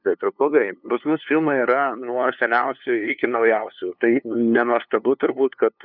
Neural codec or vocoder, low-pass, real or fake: codec, 16 kHz, 2 kbps, FunCodec, trained on LibriTTS, 25 frames a second; 5.4 kHz; fake